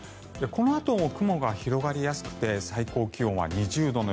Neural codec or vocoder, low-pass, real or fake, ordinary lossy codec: none; none; real; none